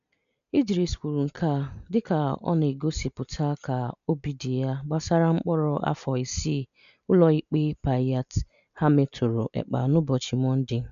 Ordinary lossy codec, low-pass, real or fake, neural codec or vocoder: none; 7.2 kHz; real; none